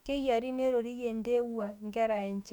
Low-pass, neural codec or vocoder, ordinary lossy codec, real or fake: 19.8 kHz; autoencoder, 48 kHz, 32 numbers a frame, DAC-VAE, trained on Japanese speech; none; fake